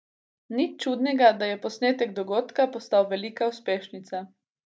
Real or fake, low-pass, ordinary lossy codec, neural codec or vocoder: real; none; none; none